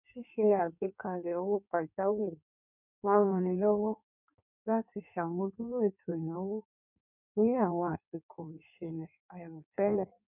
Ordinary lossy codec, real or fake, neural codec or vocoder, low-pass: none; fake; codec, 16 kHz in and 24 kHz out, 1.1 kbps, FireRedTTS-2 codec; 3.6 kHz